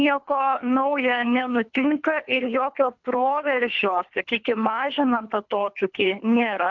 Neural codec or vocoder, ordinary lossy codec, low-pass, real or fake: codec, 24 kHz, 3 kbps, HILCodec; MP3, 64 kbps; 7.2 kHz; fake